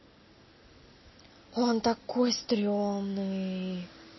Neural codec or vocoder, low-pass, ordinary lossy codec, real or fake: none; 7.2 kHz; MP3, 24 kbps; real